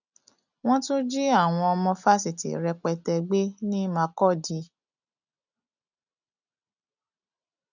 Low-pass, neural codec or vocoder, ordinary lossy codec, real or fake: 7.2 kHz; none; none; real